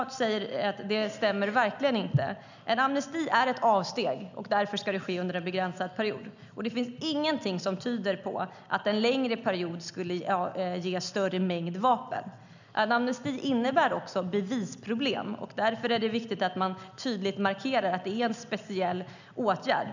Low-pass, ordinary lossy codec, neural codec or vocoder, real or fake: 7.2 kHz; none; none; real